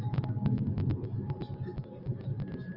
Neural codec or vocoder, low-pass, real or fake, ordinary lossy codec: none; 5.4 kHz; real; Opus, 32 kbps